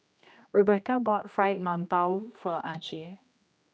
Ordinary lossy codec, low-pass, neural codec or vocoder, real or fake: none; none; codec, 16 kHz, 1 kbps, X-Codec, HuBERT features, trained on general audio; fake